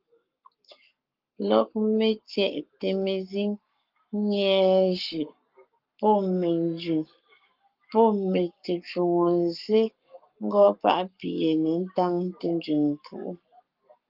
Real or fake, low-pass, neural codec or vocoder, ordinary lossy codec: fake; 5.4 kHz; codec, 44.1 kHz, 7.8 kbps, Pupu-Codec; Opus, 24 kbps